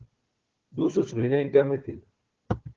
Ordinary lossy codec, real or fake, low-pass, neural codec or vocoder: Opus, 16 kbps; fake; 7.2 kHz; codec, 16 kHz, 4 kbps, FunCodec, trained on Chinese and English, 50 frames a second